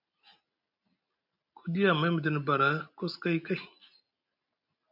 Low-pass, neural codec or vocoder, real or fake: 5.4 kHz; none; real